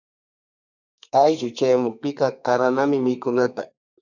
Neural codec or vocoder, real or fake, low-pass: codec, 32 kHz, 1.9 kbps, SNAC; fake; 7.2 kHz